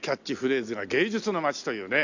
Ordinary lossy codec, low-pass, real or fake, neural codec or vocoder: Opus, 64 kbps; 7.2 kHz; real; none